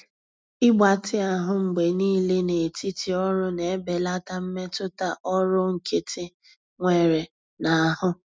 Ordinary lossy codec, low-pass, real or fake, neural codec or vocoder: none; none; real; none